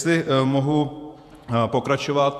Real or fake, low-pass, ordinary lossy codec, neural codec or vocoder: fake; 14.4 kHz; MP3, 96 kbps; vocoder, 48 kHz, 128 mel bands, Vocos